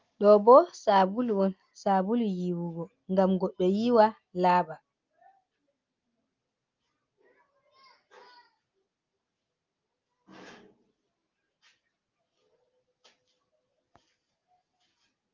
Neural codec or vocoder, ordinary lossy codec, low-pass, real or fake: none; Opus, 24 kbps; 7.2 kHz; real